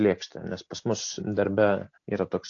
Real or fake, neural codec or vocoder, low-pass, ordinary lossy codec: real; none; 7.2 kHz; AAC, 48 kbps